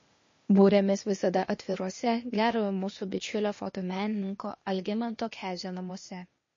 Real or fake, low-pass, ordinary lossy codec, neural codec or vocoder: fake; 7.2 kHz; MP3, 32 kbps; codec, 16 kHz, 0.8 kbps, ZipCodec